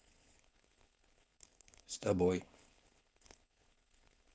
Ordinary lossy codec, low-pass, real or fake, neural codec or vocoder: none; none; fake; codec, 16 kHz, 4.8 kbps, FACodec